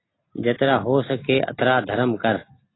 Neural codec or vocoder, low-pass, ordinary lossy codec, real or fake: none; 7.2 kHz; AAC, 16 kbps; real